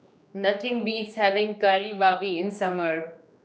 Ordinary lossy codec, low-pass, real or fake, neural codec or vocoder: none; none; fake; codec, 16 kHz, 2 kbps, X-Codec, HuBERT features, trained on general audio